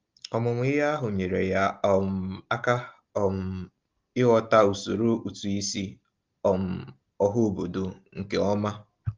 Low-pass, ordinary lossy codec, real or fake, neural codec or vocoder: 7.2 kHz; Opus, 32 kbps; real; none